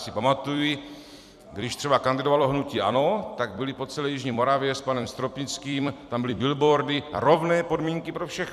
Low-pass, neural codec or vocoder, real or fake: 14.4 kHz; vocoder, 44.1 kHz, 128 mel bands every 256 samples, BigVGAN v2; fake